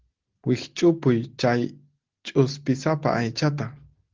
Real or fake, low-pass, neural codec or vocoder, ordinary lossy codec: real; 7.2 kHz; none; Opus, 16 kbps